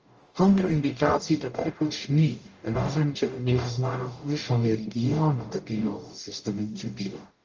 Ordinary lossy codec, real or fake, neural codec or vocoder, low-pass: Opus, 24 kbps; fake; codec, 44.1 kHz, 0.9 kbps, DAC; 7.2 kHz